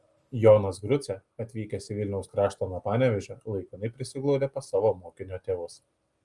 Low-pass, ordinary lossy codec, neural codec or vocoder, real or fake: 10.8 kHz; Opus, 32 kbps; none; real